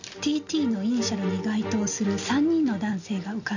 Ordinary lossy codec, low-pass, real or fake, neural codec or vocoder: none; 7.2 kHz; real; none